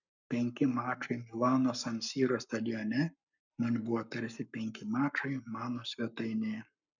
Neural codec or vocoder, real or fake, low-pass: codec, 44.1 kHz, 7.8 kbps, Pupu-Codec; fake; 7.2 kHz